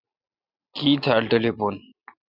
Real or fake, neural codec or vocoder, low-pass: real; none; 5.4 kHz